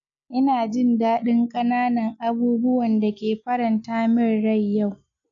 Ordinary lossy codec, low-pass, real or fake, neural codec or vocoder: AAC, 64 kbps; 7.2 kHz; real; none